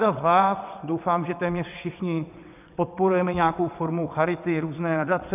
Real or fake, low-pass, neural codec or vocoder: fake; 3.6 kHz; vocoder, 22.05 kHz, 80 mel bands, WaveNeXt